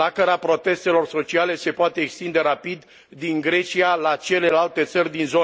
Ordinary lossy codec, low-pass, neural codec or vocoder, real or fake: none; none; none; real